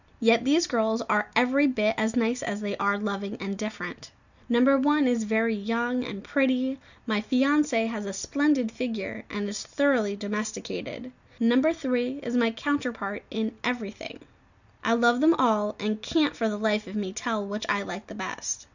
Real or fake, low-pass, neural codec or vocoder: real; 7.2 kHz; none